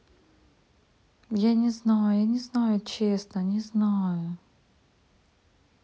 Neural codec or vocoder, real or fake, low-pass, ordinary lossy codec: none; real; none; none